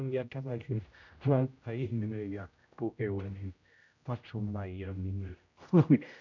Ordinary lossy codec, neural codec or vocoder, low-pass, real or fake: none; codec, 16 kHz, 0.5 kbps, X-Codec, HuBERT features, trained on general audio; 7.2 kHz; fake